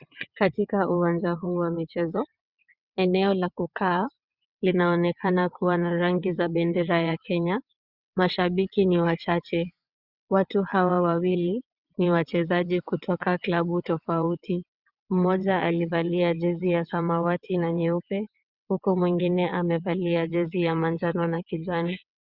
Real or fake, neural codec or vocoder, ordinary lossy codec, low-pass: fake; vocoder, 22.05 kHz, 80 mel bands, WaveNeXt; Opus, 64 kbps; 5.4 kHz